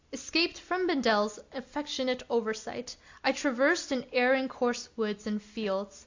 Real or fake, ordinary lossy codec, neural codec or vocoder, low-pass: real; AAC, 48 kbps; none; 7.2 kHz